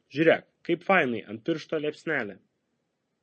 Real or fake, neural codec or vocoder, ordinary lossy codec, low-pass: real; none; MP3, 32 kbps; 9.9 kHz